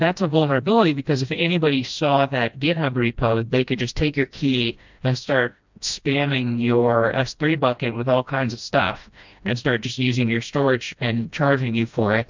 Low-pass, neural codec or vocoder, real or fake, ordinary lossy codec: 7.2 kHz; codec, 16 kHz, 1 kbps, FreqCodec, smaller model; fake; MP3, 64 kbps